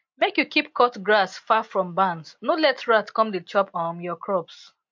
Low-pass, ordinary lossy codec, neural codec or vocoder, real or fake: 7.2 kHz; MP3, 48 kbps; none; real